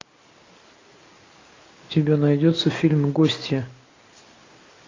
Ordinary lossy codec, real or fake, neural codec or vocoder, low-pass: AAC, 32 kbps; real; none; 7.2 kHz